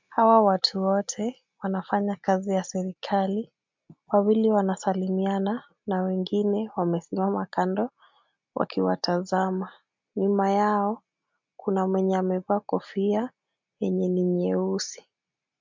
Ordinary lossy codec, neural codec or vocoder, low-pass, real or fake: MP3, 64 kbps; none; 7.2 kHz; real